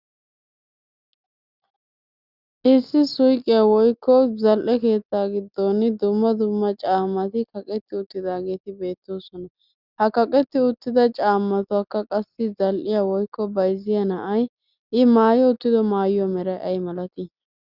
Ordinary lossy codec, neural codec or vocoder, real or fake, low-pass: Opus, 64 kbps; none; real; 5.4 kHz